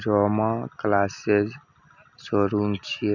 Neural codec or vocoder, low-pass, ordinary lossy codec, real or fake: none; 7.2 kHz; none; real